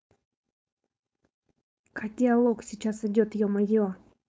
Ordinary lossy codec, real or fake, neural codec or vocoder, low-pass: none; fake; codec, 16 kHz, 4.8 kbps, FACodec; none